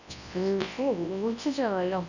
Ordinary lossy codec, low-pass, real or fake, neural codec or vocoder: none; 7.2 kHz; fake; codec, 24 kHz, 0.9 kbps, WavTokenizer, large speech release